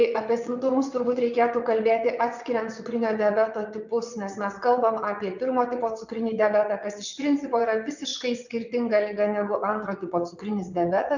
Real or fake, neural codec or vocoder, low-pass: fake; vocoder, 22.05 kHz, 80 mel bands, WaveNeXt; 7.2 kHz